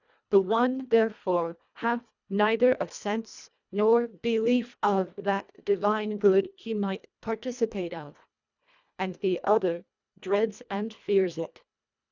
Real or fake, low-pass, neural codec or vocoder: fake; 7.2 kHz; codec, 24 kHz, 1.5 kbps, HILCodec